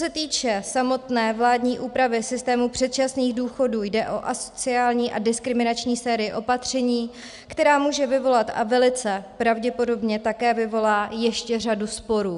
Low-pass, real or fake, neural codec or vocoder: 10.8 kHz; real; none